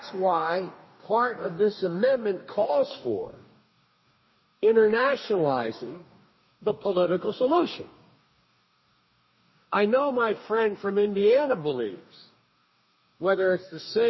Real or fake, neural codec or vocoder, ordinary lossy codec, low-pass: fake; codec, 44.1 kHz, 2.6 kbps, DAC; MP3, 24 kbps; 7.2 kHz